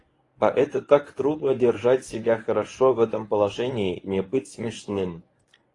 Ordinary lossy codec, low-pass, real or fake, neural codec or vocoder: AAC, 32 kbps; 10.8 kHz; fake; codec, 24 kHz, 0.9 kbps, WavTokenizer, medium speech release version 1